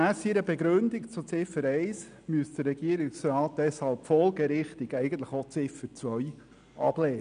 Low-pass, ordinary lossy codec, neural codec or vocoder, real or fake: 9.9 kHz; MP3, 96 kbps; none; real